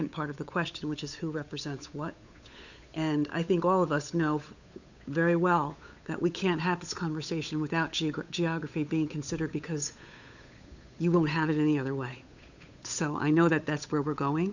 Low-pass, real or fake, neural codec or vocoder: 7.2 kHz; fake; codec, 16 kHz, 8 kbps, FunCodec, trained on Chinese and English, 25 frames a second